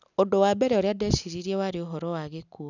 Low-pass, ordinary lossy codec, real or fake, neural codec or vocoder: 7.2 kHz; none; real; none